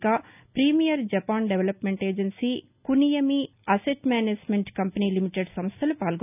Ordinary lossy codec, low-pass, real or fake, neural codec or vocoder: none; 3.6 kHz; real; none